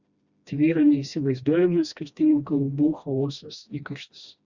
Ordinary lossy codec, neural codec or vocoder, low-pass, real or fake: Opus, 64 kbps; codec, 16 kHz, 1 kbps, FreqCodec, smaller model; 7.2 kHz; fake